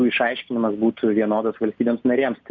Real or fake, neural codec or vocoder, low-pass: real; none; 7.2 kHz